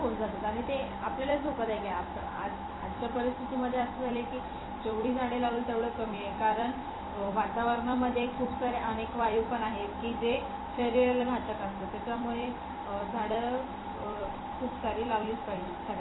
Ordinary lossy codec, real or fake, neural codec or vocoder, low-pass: AAC, 16 kbps; fake; vocoder, 44.1 kHz, 128 mel bands every 256 samples, BigVGAN v2; 7.2 kHz